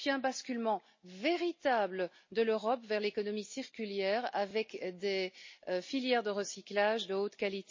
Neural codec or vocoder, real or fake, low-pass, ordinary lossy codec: none; real; 7.2 kHz; MP3, 32 kbps